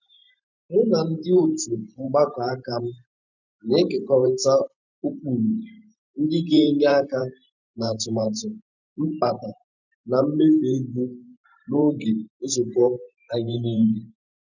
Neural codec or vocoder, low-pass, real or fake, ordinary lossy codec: vocoder, 44.1 kHz, 128 mel bands every 512 samples, BigVGAN v2; 7.2 kHz; fake; none